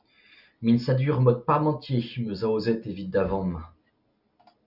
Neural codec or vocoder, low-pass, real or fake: none; 5.4 kHz; real